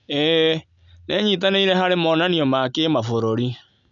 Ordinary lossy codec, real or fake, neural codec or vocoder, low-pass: none; real; none; 7.2 kHz